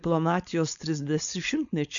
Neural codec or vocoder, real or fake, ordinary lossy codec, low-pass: codec, 16 kHz, 4.8 kbps, FACodec; fake; MP3, 64 kbps; 7.2 kHz